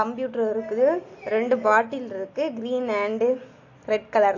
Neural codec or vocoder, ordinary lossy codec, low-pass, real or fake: none; AAC, 48 kbps; 7.2 kHz; real